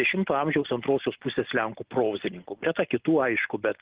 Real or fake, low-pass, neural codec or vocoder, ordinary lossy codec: real; 3.6 kHz; none; Opus, 16 kbps